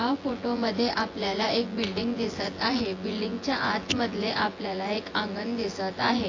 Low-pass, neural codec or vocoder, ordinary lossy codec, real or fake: 7.2 kHz; vocoder, 24 kHz, 100 mel bands, Vocos; AAC, 32 kbps; fake